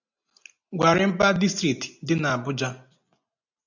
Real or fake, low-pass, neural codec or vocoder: real; 7.2 kHz; none